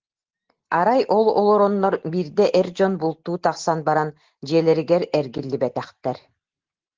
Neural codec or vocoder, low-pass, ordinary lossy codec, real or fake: none; 7.2 kHz; Opus, 16 kbps; real